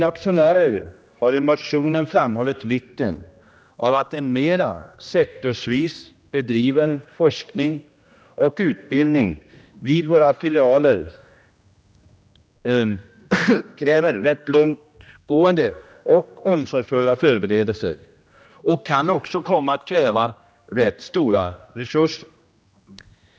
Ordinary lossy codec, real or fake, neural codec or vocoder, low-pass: none; fake; codec, 16 kHz, 1 kbps, X-Codec, HuBERT features, trained on general audio; none